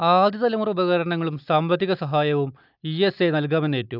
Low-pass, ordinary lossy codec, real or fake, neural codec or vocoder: 5.4 kHz; none; real; none